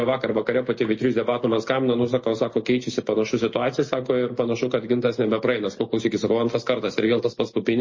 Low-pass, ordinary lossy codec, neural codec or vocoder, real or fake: 7.2 kHz; MP3, 32 kbps; none; real